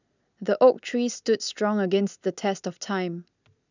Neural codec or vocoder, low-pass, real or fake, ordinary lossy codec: none; 7.2 kHz; real; none